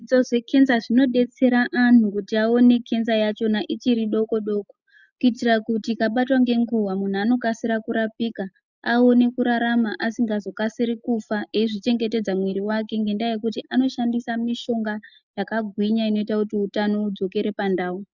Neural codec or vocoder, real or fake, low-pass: none; real; 7.2 kHz